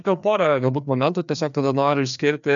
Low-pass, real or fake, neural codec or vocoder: 7.2 kHz; fake; codec, 16 kHz, 2 kbps, FreqCodec, larger model